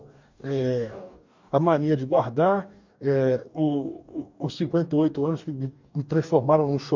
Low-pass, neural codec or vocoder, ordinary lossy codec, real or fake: 7.2 kHz; codec, 44.1 kHz, 2.6 kbps, DAC; MP3, 64 kbps; fake